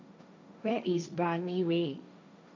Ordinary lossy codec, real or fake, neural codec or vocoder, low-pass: none; fake; codec, 16 kHz, 1.1 kbps, Voila-Tokenizer; none